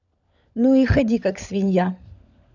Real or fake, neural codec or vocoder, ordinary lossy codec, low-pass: fake; codec, 16 kHz, 16 kbps, FunCodec, trained on LibriTTS, 50 frames a second; none; 7.2 kHz